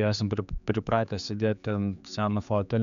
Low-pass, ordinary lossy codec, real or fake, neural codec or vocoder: 7.2 kHz; MP3, 96 kbps; fake; codec, 16 kHz, 4 kbps, X-Codec, HuBERT features, trained on general audio